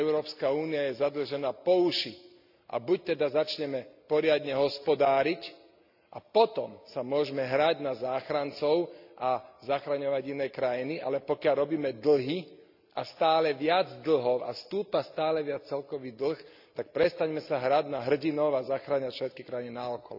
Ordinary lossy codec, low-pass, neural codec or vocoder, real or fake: none; 5.4 kHz; none; real